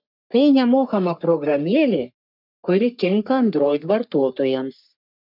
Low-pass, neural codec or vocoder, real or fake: 5.4 kHz; codec, 44.1 kHz, 3.4 kbps, Pupu-Codec; fake